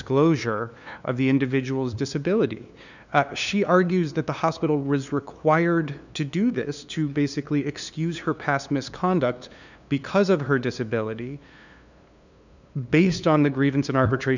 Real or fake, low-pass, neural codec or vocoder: fake; 7.2 kHz; codec, 16 kHz, 2 kbps, FunCodec, trained on LibriTTS, 25 frames a second